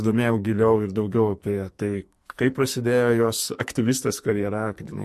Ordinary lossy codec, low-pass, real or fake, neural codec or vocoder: MP3, 64 kbps; 14.4 kHz; fake; codec, 44.1 kHz, 2.6 kbps, SNAC